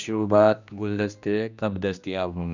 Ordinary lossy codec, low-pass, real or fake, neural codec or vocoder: none; 7.2 kHz; fake; codec, 16 kHz, 1 kbps, X-Codec, HuBERT features, trained on general audio